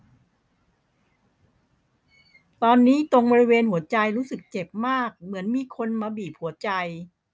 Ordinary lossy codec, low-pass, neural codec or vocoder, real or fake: none; none; none; real